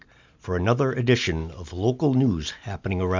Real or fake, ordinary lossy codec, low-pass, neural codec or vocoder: real; MP3, 64 kbps; 7.2 kHz; none